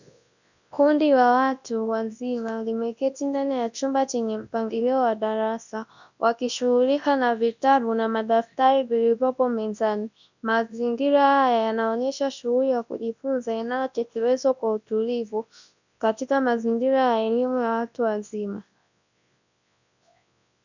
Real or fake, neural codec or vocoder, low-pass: fake; codec, 24 kHz, 0.9 kbps, WavTokenizer, large speech release; 7.2 kHz